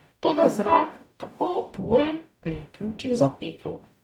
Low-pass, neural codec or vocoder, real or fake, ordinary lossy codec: 19.8 kHz; codec, 44.1 kHz, 0.9 kbps, DAC; fake; none